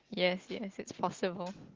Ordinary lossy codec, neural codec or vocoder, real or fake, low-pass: Opus, 16 kbps; none; real; 7.2 kHz